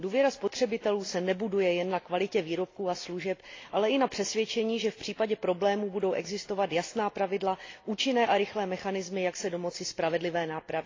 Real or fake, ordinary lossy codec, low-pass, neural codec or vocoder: real; AAC, 32 kbps; 7.2 kHz; none